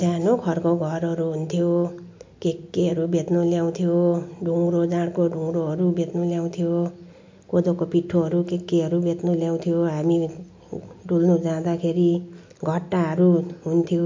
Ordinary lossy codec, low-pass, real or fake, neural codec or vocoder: MP3, 48 kbps; 7.2 kHz; real; none